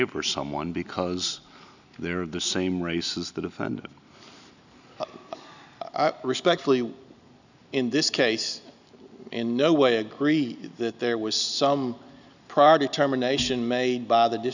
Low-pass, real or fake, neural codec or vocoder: 7.2 kHz; real; none